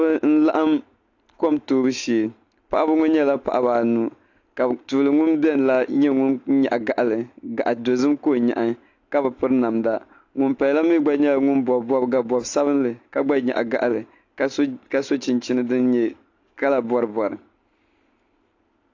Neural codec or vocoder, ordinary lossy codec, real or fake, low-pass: none; AAC, 48 kbps; real; 7.2 kHz